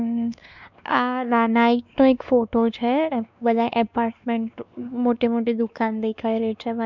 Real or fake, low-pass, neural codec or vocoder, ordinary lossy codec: fake; 7.2 kHz; codec, 16 kHz, 2 kbps, X-Codec, WavLM features, trained on Multilingual LibriSpeech; none